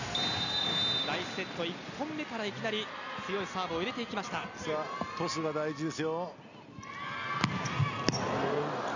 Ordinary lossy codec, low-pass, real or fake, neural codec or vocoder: none; 7.2 kHz; real; none